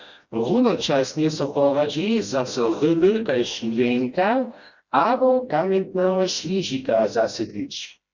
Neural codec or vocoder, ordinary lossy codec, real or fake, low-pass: codec, 16 kHz, 1 kbps, FreqCodec, smaller model; Opus, 64 kbps; fake; 7.2 kHz